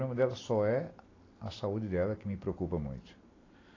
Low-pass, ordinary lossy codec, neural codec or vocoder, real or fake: 7.2 kHz; AAC, 32 kbps; none; real